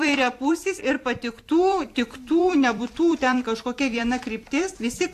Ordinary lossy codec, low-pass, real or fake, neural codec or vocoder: AAC, 64 kbps; 14.4 kHz; fake; vocoder, 44.1 kHz, 128 mel bands every 256 samples, BigVGAN v2